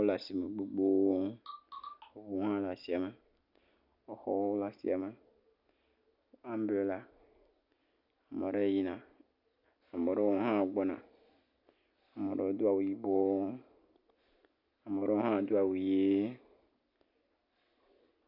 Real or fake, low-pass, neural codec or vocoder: real; 5.4 kHz; none